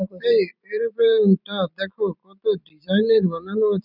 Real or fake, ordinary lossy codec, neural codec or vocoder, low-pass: fake; none; vocoder, 44.1 kHz, 128 mel bands every 256 samples, BigVGAN v2; 5.4 kHz